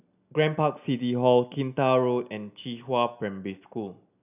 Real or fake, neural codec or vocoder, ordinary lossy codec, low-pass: real; none; none; 3.6 kHz